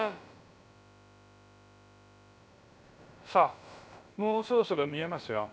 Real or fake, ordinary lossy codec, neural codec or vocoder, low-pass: fake; none; codec, 16 kHz, about 1 kbps, DyCAST, with the encoder's durations; none